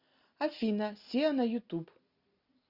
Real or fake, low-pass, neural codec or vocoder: real; 5.4 kHz; none